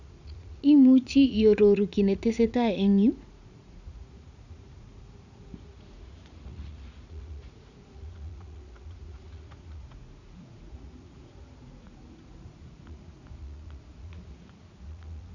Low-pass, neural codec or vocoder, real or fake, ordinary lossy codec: 7.2 kHz; vocoder, 44.1 kHz, 80 mel bands, Vocos; fake; none